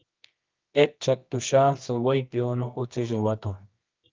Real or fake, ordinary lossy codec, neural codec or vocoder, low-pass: fake; Opus, 24 kbps; codec, 24 kHz, 0.9 kbps, WavTokenizer, medium music audio release; 7.2 kHz